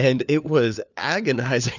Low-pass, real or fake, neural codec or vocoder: 7.2 kHz; fake; codec, 16 kHz, 4 kbps, FunCodec, trained on LibriTTS, 50 frames a second